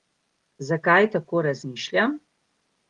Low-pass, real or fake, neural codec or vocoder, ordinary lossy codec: 10.8 kHz; real; none; Opus, 24 kbps